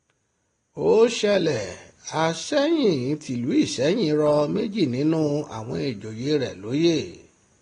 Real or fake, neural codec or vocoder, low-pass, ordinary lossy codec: real; none; 9.9 kHz; AAC, 32 kbps